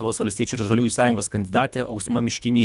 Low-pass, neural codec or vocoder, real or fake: 10.8 kHz; codec, 24 kHz, 1.5 kbps, HILCodec; fake